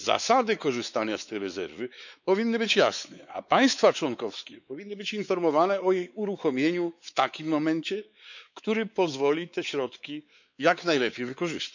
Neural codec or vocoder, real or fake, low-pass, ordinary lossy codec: codec, 16 kHz, 4 kbps, X-Codec, WavLM features, trained on Multilingual LibriSpeech; fake; 7.2 kHz; none